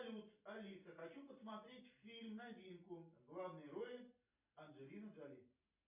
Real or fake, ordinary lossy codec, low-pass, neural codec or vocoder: real; MP3, 32 kbps; 3.6 kHz; none